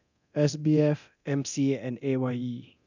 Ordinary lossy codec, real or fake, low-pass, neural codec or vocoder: none; fake; 7.2 kHz; codec, 24 kHz, 0.9 kbps, DualCodec